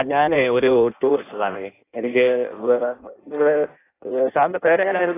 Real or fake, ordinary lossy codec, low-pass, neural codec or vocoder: fake; AAC, 16 kbps; 3.6 kHz; codec, 16 kHz in and 24 kHz out, 0.6 kbps, FireRedTTS-2 codec